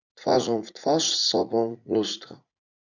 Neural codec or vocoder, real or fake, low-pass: vocoder, 44.1 kHz, 80 mel bands, Vocos; fake; 7.2 kHz